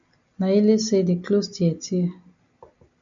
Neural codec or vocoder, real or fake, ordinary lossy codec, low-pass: none; real; AAC, 64 kbps; 7.2 kHz